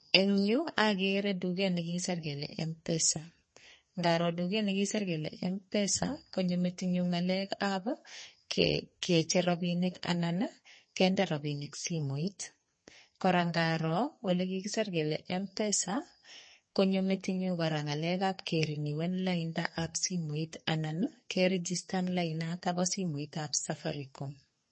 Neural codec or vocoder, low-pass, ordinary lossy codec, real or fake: codec, 32 kHz, 1.9 kbps, SNAC; 9.9 kHz; MP3, 32 kbps; fake